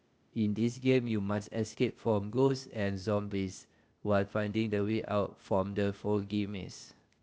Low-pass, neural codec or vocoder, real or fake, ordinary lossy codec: none; codec, 16 kHz, 0.8 kbps, ZipCodec; fake; none